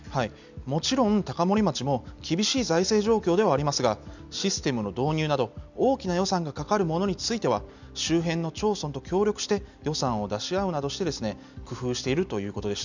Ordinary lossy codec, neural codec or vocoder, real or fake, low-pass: none; none; real; 7.2 kHz